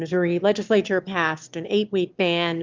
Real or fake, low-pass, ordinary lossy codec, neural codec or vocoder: fake; 7.2 kHz; Opus, 32 kbps; autoencoder, 22.05 kHz, a latent of 192 numbers a frame, VITS, trained on one speaker